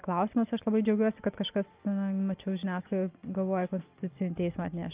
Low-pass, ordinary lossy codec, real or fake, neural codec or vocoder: 3.6 kHz; Opus, 24 kbps; real; none